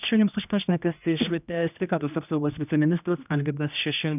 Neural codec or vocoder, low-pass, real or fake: codec, 16 kHz, 1 kbps, X-Codec, HuBERT features, trained on general audio; 3.6 kHz; fake